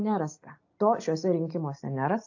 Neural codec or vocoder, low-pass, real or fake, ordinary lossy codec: none; 7.2 kHz; real; AAC, 48 kbps